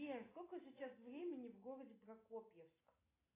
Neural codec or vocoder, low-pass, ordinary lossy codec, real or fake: none; 3.6 kHz; AAC, 24 kbps; real